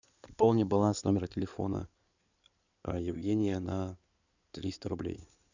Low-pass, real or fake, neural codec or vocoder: 7.2 kHz; fake; codec, 16 kHz in and 24 kHz out, 2.2 kbps, FireRedTTS-2 codec